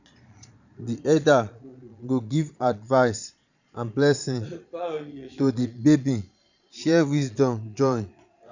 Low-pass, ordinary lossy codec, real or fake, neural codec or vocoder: 7.2 kHz; none; fake; vocoder, 22.05 kHz, 80 mel bands, Vocos